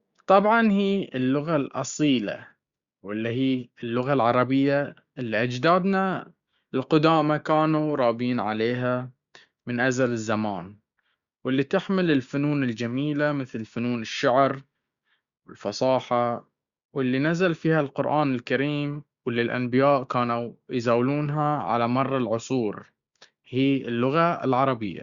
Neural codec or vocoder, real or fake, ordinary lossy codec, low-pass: codec, 16 kHz, 6 kbps, DAC; fake; Opus, 64 kbps; 7.2 kHz